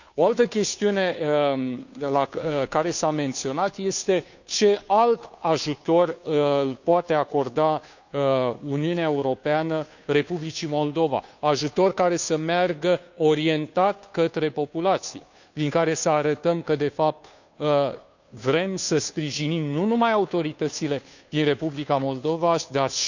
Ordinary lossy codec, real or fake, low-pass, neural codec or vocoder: none; fake; 7.2 kHz; codec, 16 kHz, 2 kbps, FunCodec, trained on Chinese and English, 25 frames a second